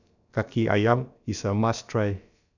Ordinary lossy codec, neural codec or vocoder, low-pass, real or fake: none; codec, 16 kHz, about 1 kbps, DyCAST, with the encoder's durations; 7.2 kHz; fake